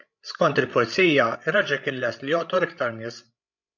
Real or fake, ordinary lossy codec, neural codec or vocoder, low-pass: fake; MP3, 64 kbps; codec, 16 kHz, 16 kbps, FreqCodec, larger model; 7.2 kHz